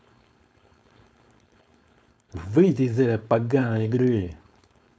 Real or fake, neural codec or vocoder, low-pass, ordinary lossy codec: fake; codec, 16 kHz, 4.8 kbps, FACodec; none; none